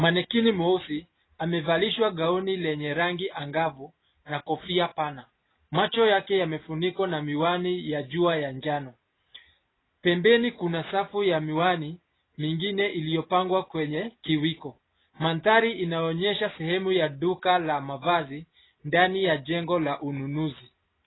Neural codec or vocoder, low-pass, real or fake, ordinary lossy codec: none; 7.2 kHz; real; AAC, 16 kbps